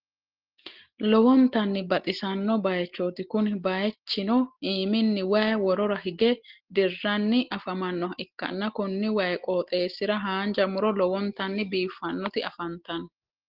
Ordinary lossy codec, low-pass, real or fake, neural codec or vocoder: Opus, 16 kbps; 5.4 kHz; real; none